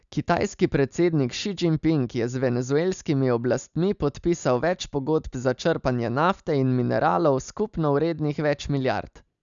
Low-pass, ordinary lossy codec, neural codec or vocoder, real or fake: 7.2 kHz; none; none; real